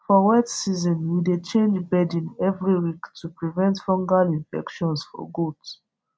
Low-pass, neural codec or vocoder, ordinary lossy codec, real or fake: none; none; none; real